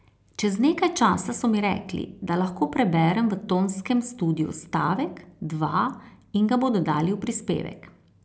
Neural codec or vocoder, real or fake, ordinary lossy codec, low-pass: none; real; none; none